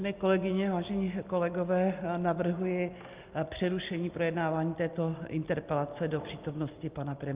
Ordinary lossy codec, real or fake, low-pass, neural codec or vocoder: Opus, 24 kbps; real; 3.6 kHz; none